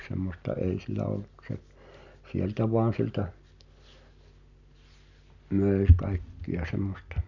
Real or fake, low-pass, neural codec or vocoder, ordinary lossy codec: real; 7.2 kHz; none; none